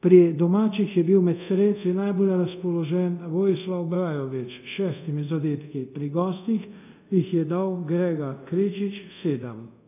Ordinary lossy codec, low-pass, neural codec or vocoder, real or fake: none; 3.6 kHz; codec, 24 kHz, 0.5 kbps, DualCodec; fake